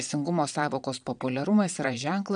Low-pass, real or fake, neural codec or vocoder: 9.9 kHz; fake; vocoder, 22.05 kHz, 80 mel bands, Vocos